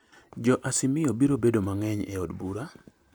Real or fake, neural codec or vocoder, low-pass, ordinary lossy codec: real; none; none; none